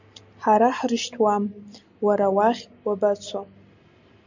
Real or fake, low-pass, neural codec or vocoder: real; 7.2 kHz; none